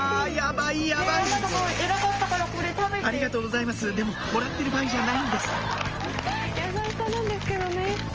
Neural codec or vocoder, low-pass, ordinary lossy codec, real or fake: none; 7.2 kHz; Opus, 24 kbps; real